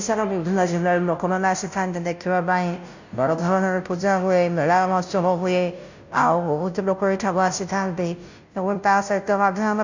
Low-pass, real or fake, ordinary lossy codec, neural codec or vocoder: 7.2 kHz; fake; none; codec, 16 kHz, 0.5 kbps, FunCodec, trained on Chinese and English, 25 frames a second